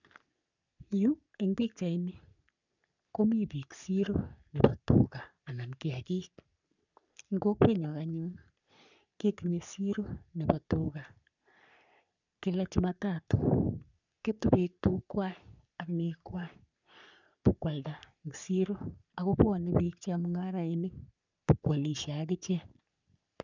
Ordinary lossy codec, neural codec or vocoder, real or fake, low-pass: none; codec, 44.1 kHz, 3.4 kbps, Pupu-Codec; fake; 7.2 kHz